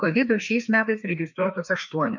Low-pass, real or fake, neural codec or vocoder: 7.2 kHz; fake; codec, 16 kHz, 2 kbps, FreqCodec, larger model